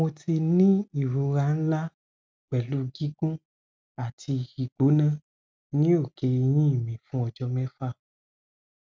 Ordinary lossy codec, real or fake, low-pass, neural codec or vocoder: none; real; none; none